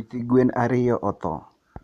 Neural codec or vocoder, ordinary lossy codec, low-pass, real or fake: none; none; 14.4 kHz; real